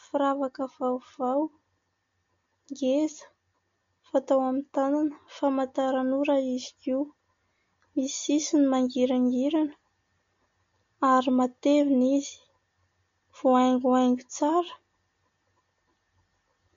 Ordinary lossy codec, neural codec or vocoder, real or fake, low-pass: MP3, 48 kbps; none; real; 7.2 kHz